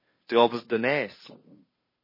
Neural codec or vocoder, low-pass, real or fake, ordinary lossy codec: codec, 16 kHz, 2 kbps, FunCodec, trained on Chinese and English, 25 frames a second; 5.4 kHz; fake; MP3, 24 kbps